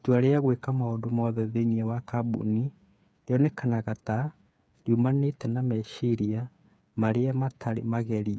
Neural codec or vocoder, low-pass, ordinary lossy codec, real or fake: codec, 16 kHz, 8 kbps, FreqCodec, smaller model; none; none; fake